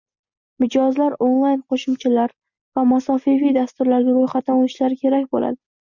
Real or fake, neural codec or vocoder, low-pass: real; none; 7.2 kHz